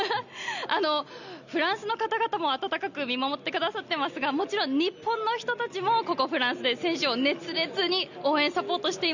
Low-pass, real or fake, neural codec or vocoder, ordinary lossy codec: 7.2 kHz; real; none; none